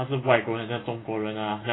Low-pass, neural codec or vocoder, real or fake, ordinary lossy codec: 7.2 kHz; none; real; AAC, 16 kbps